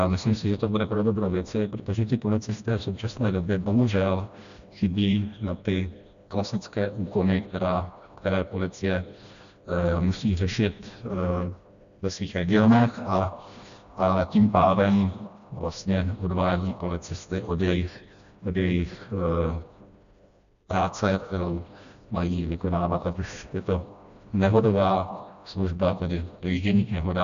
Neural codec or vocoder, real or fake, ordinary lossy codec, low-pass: codec, 16 kHz, 1 kbps, FreqCodec, smaller model; fake; AAC, 96 kbps; 7.2 kHz